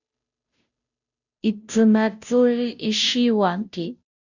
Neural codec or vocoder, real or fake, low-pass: codec, 16 kHz, 0.5 kbps, FunCodec, trained on Chinese and English, 25 frames a second; fake; 7.2 kHz